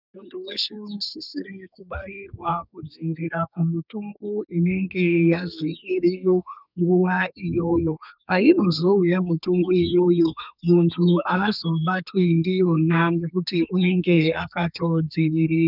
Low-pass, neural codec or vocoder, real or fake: 5.4 kHz; codec, 32 kHz, 1.9 kbps, SNAC; fake